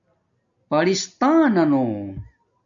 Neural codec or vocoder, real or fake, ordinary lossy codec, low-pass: none; real; MP3, 96 kbps; 7.2 kHz